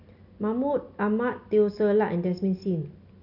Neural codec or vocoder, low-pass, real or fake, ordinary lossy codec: none; 5.4 kHz; real; none